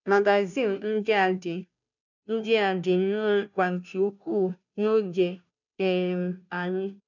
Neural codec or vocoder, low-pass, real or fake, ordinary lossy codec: codec, 16 kHz, 0.5 kbps, FunCodec, trained on Chinese and English, 25 frames a second; 7.2 kHz; fake; none